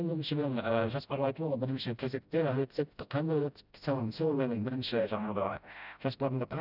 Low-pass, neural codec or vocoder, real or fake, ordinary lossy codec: 5.4 kHz; codec, 16 kHz, 0.5 kbps, FreqCodec, smaller model; fake; none